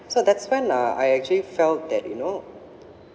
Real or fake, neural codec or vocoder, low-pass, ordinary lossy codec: real; none; none; none